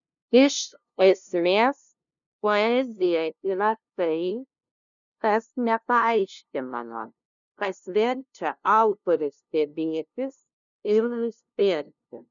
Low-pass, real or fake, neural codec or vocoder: 7.2 kHz; fake; codec, 16 kHz, 0.5 kbps, FunCodec, trained on LibriTTS, 25 frames a second